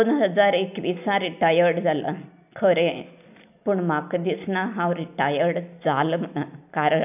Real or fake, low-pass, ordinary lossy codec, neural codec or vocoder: real; 3.6 kHz; none; none